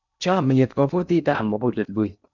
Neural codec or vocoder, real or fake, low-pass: codec, 16 kHz in and 24 kHz out, 0.6 kbps, FocalCodec, streaming, 2048 codes; fake; 7.2 kHz